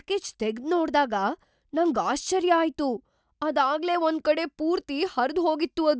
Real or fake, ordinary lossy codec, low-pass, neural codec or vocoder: real; none; none; none